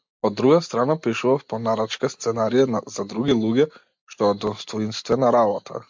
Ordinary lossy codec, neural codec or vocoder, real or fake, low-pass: MP3, 64 kbps; none; real; 7.2 kHz